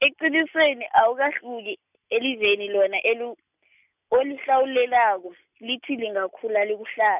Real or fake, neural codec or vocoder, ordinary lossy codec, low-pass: real; none; none; 3.6 kHz